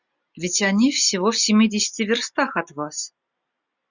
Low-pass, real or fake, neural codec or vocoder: 7.2 kHz; real; none